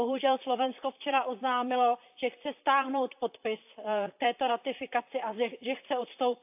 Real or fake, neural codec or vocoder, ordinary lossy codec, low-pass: fake; vocoder, 44.1 kHz, 128 mel bands, Pupu-Vocoder; AAC, 32 kbps; 3.6 kHz